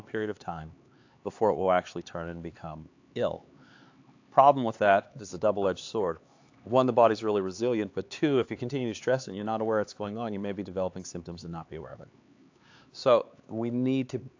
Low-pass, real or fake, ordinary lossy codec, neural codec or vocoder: 7.2 kHz; fake; AAC, 48 kbps; codec, 16 kHz, 4 kbps, X-Codec, HuBERT features, trained on LibriSpeech